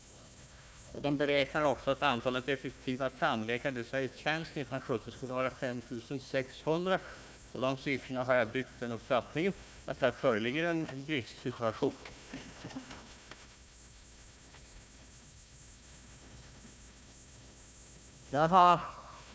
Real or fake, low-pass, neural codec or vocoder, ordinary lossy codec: fake; none; codec, 16 kHz, 1 kbps, FunCodec, trained on Chinese and English, 50 frames a second; none